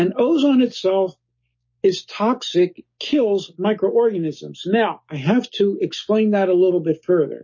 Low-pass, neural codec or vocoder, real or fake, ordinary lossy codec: 7.2 kHz; codec, 16 kHz, 6 kbps, DAC; fake; MP3, 32 kbps